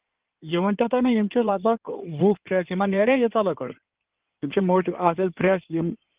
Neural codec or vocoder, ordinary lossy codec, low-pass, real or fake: codec, 16 kHz in and 24 kHz out, 2.2 kbps, FireRedTTS-2 codec; Opus, 32 kbps; 3.6 kHz; fake